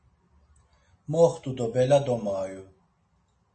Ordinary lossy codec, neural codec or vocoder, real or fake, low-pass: MP3, 32 kbps; none; real; 9.9 kHz